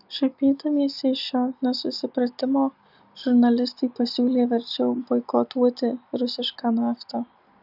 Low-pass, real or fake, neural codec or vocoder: 5.4 kHz; real; none